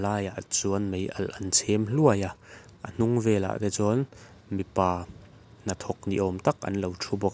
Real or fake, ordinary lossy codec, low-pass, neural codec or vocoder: real; none; none; none